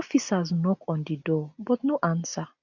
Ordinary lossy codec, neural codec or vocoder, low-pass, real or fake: none; none; 7.2 kHz; real